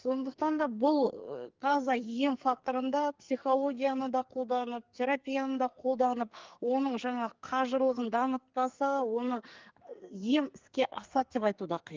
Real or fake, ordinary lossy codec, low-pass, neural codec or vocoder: fake; Opus, 32 kbps; 7.2 kHz; codec, 44.1 kHz, 2.6 kbps, SNAC